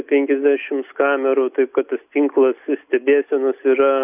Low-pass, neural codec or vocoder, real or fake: 3.6 kHz; none; real